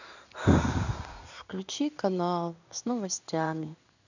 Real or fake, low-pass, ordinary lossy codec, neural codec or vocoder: fake; 7.2 kHz; none; codec, 16 kHz in and 24 kHz out, 1 kbps, XY-Tokenizer